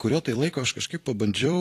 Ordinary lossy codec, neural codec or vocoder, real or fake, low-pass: AAC, 48 kbps; vocoder, 44.1 kHz, 128 mel bands every 256 samples, BigVGAN v2; fake; 14.4 kHz